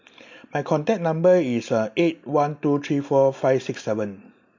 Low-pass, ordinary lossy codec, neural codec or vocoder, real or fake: 7.2 kHz; MP3, 48 kbps; none; real